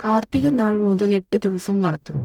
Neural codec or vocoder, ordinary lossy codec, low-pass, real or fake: codec, 44.1 kHz, 0.9 kbps, DAC; none; 19.8 kHz; fake